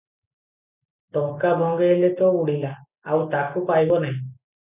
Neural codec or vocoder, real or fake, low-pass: none; real; 3.6 kHz